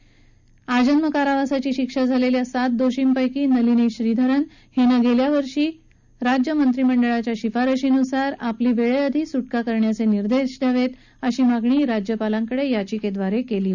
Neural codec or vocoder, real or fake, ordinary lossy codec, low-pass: none; real; none; 7.2 kHz